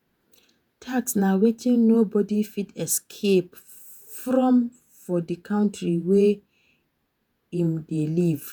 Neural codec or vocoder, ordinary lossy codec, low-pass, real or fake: vocoder, 48 kHz, 128 mel bands, Vocos; none; none; fake